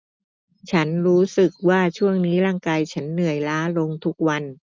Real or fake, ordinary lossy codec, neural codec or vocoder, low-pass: real; none; none; none